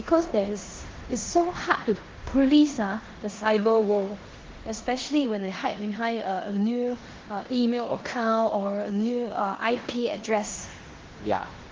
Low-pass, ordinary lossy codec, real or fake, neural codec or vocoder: 7.2 kHz; Opus, 16 kbps; fake; codec, 16 kHz in and 24 kHz out, 0.9 kbps, LongCat-Audio-Codec, four codebook decoder